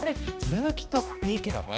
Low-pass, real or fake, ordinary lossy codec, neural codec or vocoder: none; fake; none; codec, 16 kHz, 1 kbps, X-Codec, HuBERT features, trained on balanced general audio